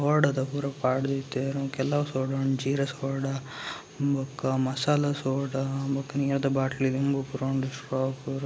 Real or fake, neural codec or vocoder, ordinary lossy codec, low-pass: real; none; none; none